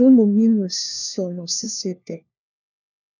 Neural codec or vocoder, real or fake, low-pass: codec, 16 kHz, 1 kbps, FunCodec, trained on LibriTTS, 50 frames a second; fake; 7.2 kHz